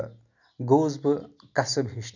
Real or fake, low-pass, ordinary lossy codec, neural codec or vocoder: real; 7.2 kHz; none; none